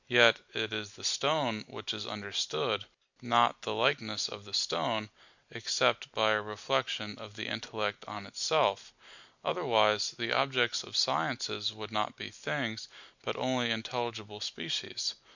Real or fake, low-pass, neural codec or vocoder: real; 7.2 kHz; none